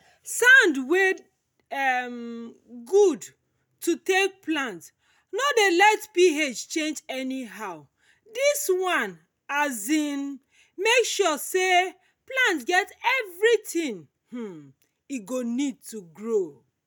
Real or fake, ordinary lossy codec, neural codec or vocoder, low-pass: real; none; none; none